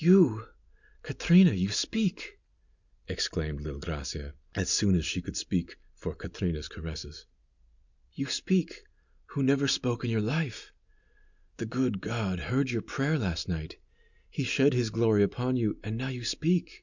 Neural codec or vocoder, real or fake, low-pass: none; real; 7.2 kHz